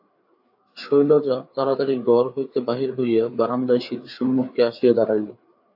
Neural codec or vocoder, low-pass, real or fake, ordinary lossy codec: codec, 16 kHz, 4 kbps, FreqCodec, larger model; 5.4 kHz; fake; AAC, 48 kbps